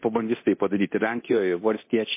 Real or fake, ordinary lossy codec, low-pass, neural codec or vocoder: fake; MP3, 24 kbps; 3.6 kHz; codec, 24 kHz, 0.9 kbps, DualCodec